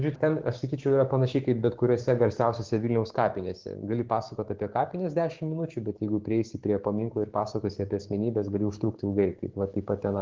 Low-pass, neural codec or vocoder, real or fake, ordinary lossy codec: 7.2 kHz; codec, 16 kHz, 6 kbps, DAC; fake; Opus, 16 kbps